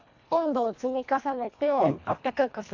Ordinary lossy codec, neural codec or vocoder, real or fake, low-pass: AAC, 48 kbps; codec, 24 kHz, 1.5 kbps, HILCodec; fake; 7.2 kHz